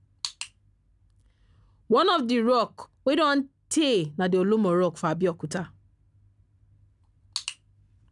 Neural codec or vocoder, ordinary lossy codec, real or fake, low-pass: none; none; real; 10.8 kHz